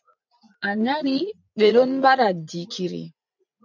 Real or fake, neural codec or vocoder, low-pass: fake; vocoder, 44.1 kHz, 80 mel bands, Vocos; 7.2 kHz